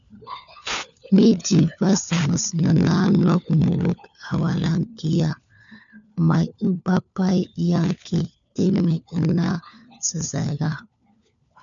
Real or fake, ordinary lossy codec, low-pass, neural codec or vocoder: fake; MP3, 96 kbps; 7.2 kHz; codec, 16 kHz, 4 kbps, FunCodec, trained on LibriTTS, 50 frames a second